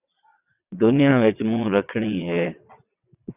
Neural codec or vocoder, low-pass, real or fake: vocoder, 22.05 kHz, 80 mel bands, WaveNeXt; 3.6 kHz; fake